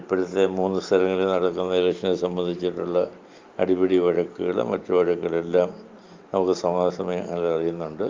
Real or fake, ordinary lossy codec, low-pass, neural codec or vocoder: fake; Opus, 24 kbps; 7.2 kHz; vocoder, 44.1 kHz, 128 mel bands every 512 samples, BigVGAN v2